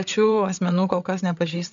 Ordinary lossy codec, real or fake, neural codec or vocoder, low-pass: MP3, 64 kbps; fake; codec, 16 kHz, 8 kbps, FreqCodec, larger model; 7.2 kHz